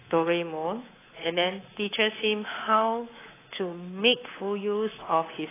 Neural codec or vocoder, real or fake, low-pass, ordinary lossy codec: codec, 24 kHz, 3.1 kbps, DualCodec; fake; 3.6 kHz; AAC, 16 kbps